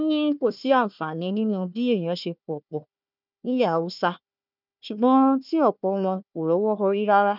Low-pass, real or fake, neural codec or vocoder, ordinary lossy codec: 5.4 kHz; fake; codec, 16 kHz, 1 kbps, FunCodec, trained on Chinese and English, 50 frames a second; none